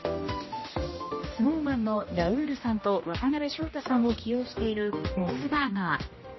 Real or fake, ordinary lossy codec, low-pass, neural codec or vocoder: fake; MP3, 24 kbps; 7.2 kHz; codec, 16 kHz, 1 kbps, X-Codec, HuBERT features, trained on general audio